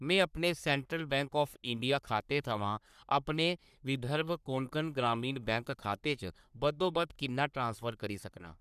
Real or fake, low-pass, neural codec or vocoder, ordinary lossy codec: fake; 14.4 kHz; codec, 44.1 kHz, 3.4 kbps, Pupu-Codec; none